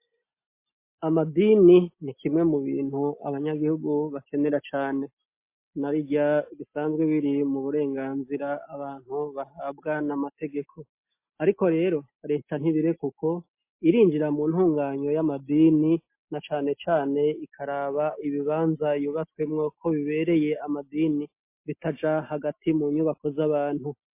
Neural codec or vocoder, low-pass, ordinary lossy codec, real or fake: none; 3.6 kHz; MP3, 24 kbps; real